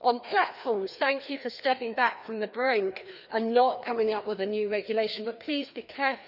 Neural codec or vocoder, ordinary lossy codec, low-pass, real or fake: codec, 16 kHz, 2 kbps, FreqCodec, larger model; none; 5.4 kHz; fake